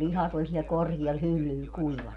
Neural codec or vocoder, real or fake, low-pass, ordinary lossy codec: none; real; 10.8 kHz; none